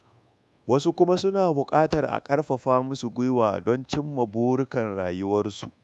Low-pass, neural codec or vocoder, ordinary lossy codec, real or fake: none; codec, 24 kHz, 1.2 kbps, DualCodec; none; fake